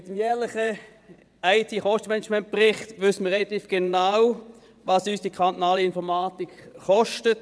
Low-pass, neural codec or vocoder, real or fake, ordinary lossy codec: none; vocoder, 22.05 kHz, 80 mel bands, WaveNeXt; fake; none